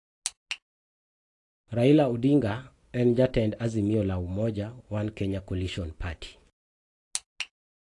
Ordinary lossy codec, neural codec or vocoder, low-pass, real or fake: AAC, 48 kbps; none; 10.8 kHz; real